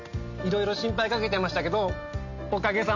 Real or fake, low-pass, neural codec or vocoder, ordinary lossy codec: real; 7.2 kHz; none; none